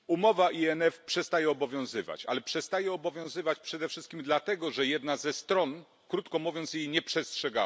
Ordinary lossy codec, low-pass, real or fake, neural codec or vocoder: none; none; real; none